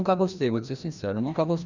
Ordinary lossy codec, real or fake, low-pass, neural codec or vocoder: none; fake; 7.2 kHz; codec, 16 kHz, 1 kbps, FreqCodec, larger model